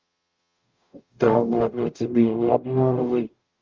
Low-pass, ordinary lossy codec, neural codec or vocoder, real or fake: 7.2 kHz; Opus, 32 kbps; codec, 44.1 kHz, 0.9 kbps, DAC; fake